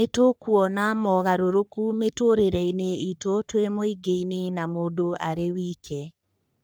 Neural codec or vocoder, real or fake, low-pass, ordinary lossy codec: codec, 44.1 kHz, 3.4 kbps, Pupu-Codec; fake; none; none